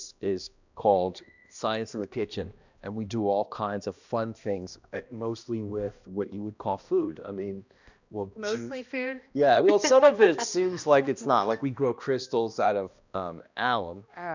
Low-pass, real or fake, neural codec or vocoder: 7.2 kHz; fake; codec, 16 kHz, 1 kbps, X-Codec, HuBERT features, trained on balanced general audio